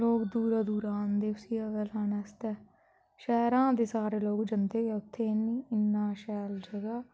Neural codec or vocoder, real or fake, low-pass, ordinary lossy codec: none; real; none; none